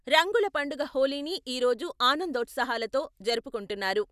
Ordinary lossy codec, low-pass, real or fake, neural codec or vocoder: none; 14.4 kHz; real; none